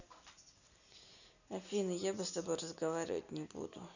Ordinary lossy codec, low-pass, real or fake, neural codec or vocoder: none; 7.2 kHz; real; none